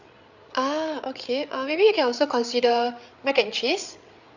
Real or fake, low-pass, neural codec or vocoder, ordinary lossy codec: fake; 7.2 kHz; codec, 16 kHz, 8 kbps, FreqCodec, larger model; none